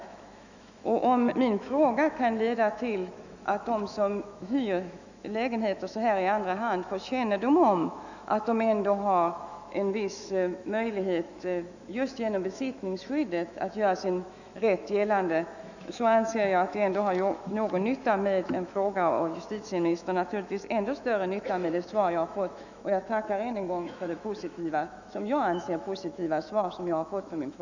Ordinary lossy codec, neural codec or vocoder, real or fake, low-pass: none; autoencoder, 48 kHz, 128 numbers a frame, DAC-VAE, trained on Japanese speech; fake; 7.2 kHz